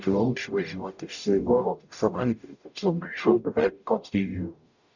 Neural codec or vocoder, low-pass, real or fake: codec, 44.1 kHz, 0.9 kbps, DAC; 7.2 kHz; fake